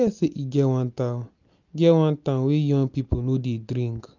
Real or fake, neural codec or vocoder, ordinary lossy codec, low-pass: real; none; none; 7.2 kHz